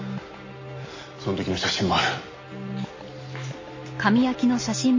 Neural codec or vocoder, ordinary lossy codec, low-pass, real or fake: none; MP3, 32 kbps; 7.2 kHz; real